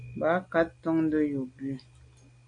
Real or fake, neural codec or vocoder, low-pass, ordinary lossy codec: real; none; 9.9 kHz; AAC, 48 kbps